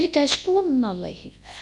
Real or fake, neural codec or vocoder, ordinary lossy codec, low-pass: fake; codec, 24 kHz, 0.9 kbps, WavTokenizer, large speech release; none; 10.8 kHz